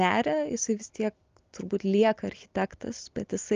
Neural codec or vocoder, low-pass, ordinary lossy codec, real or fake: none; 7.2 kHz; Opus, 32 kbps; real